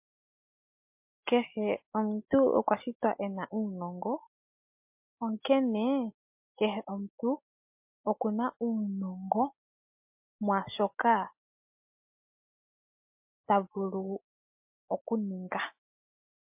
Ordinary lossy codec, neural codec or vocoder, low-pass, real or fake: MP3, 32 kbps; none; 3.6 kHz; real